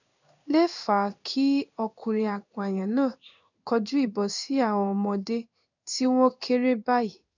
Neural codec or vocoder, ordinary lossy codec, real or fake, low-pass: codec, 16 kHz in and 24 kHz out, 1 kbps, XY-Tokenizer; none; fake; 7.2 kHz